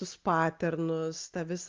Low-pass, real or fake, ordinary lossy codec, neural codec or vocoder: 7.2 kHz; real; Opus, 24 kbps; none